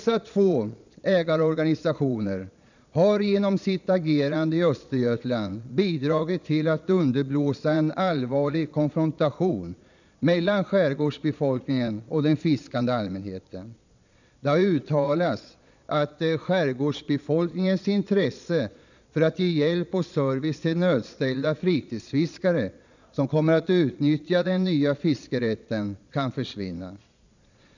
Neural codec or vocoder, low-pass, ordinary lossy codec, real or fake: vocoder, 22.05 kHz, 80 mel bands, Vocos; 7.2 kHz; none; fake